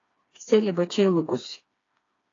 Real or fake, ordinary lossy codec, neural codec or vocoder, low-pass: fake; AAC, 32 kbps; codec, 16 kHz, 2 kbps, FreqCodec, smaller model; 7.2 kHz